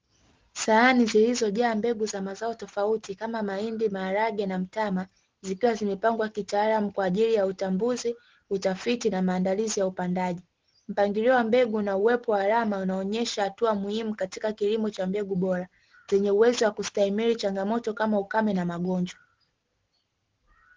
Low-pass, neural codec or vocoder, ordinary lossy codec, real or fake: 7.2 kHz; none; Opus, 16 kbps; real